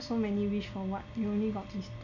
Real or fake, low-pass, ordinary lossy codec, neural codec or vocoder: real; 7.2 kHz; none; none